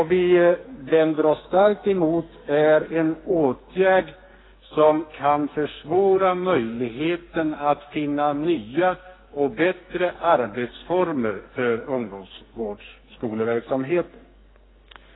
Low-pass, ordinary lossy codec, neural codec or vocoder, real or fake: 7.2 kHz; AAC, 16 kbps; codec, 44.1 kHz, 2.6 kbps, SNAC; fake